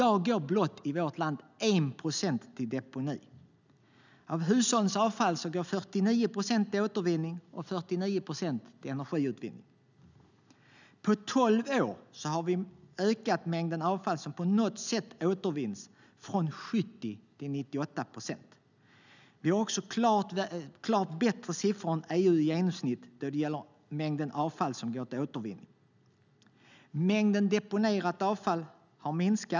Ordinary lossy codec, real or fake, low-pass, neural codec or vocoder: none; real; 7.2 kHz; none